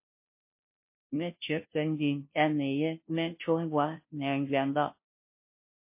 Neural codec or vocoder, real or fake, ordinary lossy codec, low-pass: codec, 16 kHz, 0.5 kbps, FunCodec, trained on Chinese and English, 25 frames a second; fake; MP3, 24 kbps; 3.6 kHz